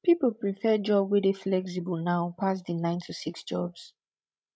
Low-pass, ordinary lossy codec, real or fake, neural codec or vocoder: none; none; fake; codec, 16 kHz, 16 kbps, FreqCodec, larger model